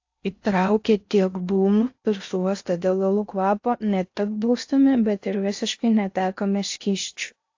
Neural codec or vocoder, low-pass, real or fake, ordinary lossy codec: codec, 16 kHz in and 24 kHz out, 0.6 kbps, FocalCodec, streaming, 4096 codes; 7.2 kHz; fake; AAC, 48 kbps